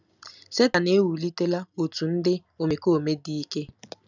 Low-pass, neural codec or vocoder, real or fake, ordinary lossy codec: 7.2 kHz; none; real; none